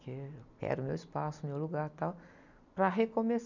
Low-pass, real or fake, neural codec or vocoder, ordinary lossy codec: 7.2 kHz; real; none; none